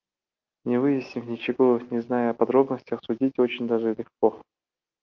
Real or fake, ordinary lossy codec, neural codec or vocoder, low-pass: real; Opus, 32 kbps; none; 7.2 kHz